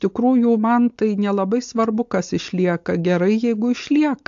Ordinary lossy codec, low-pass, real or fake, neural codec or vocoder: AAC, 64 kbps; 7.2 kHz; real; none